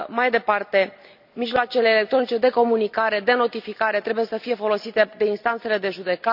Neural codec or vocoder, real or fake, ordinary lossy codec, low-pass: none; real; none; 5.4 kHz